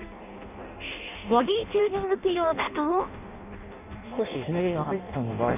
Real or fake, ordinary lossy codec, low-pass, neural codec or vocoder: fake; none; 3.6 kHz; codec, 16 kHz in and 24 kHz out, 0.6 kbps, FireRedTTS-2 codec